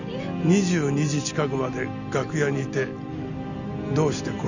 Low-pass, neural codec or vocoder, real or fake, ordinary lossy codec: 7.2 kHz; none; real; none